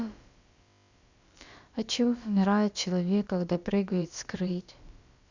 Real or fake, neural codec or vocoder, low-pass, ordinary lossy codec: fake; codec, 16 kHz, about 1 kbps, DyCAST, with the encoder's durations; 7.2 kHz; Opus, 64 kbps